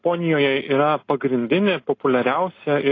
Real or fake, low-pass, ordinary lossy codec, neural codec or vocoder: real; 7.2 kHz; AAC, 32 kbps; none